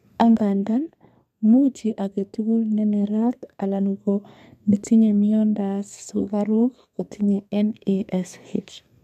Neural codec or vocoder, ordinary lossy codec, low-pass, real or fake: codec, 32 kHz, 1.9 kbps, SNAC; MP3, 96 kbps; 14.4 kHz; fake